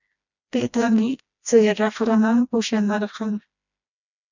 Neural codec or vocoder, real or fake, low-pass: codec, 16 kHz, 1 kbps, FreqCodec, smaller model; fake; 7.2 kHz